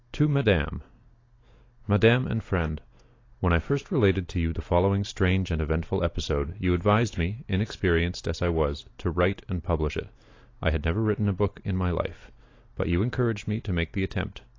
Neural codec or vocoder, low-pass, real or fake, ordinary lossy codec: none; 7.2 kHz; real; AAC, 32 kbps